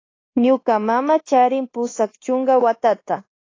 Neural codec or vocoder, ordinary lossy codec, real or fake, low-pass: codec, 24 kHz, 1.2 kbps, DualCodec; AAC, 32 kbps; fake; 7.2 kHz